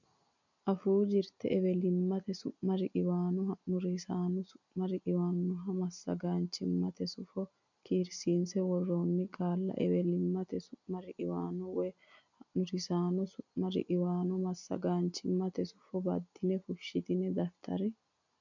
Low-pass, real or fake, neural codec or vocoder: 7.2 kHz; real; none